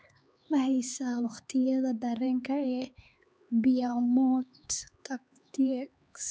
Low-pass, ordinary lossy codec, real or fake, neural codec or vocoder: none; none; fake; codec, 16 kHz, 4 kbps, X-Codec, HuBERT features, trained on LibriSpeech